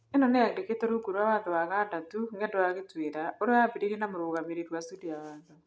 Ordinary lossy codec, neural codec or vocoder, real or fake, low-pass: none; none; real; none